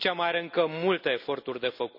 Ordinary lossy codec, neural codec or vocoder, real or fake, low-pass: none; none; real; 5.4 kHz